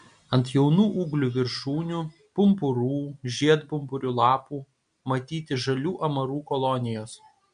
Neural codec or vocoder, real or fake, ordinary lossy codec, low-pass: none; real; MP3, 64 kbps; 9.9 kHz